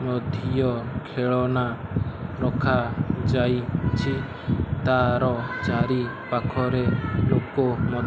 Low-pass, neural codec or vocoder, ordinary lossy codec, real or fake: none; none; none; real